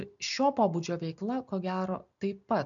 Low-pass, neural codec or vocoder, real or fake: 7.2 kHz; none; real